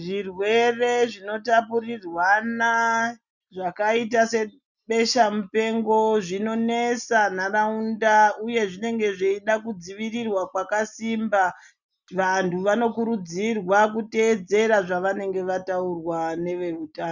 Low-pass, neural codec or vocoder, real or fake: 7.2 kHz; none; real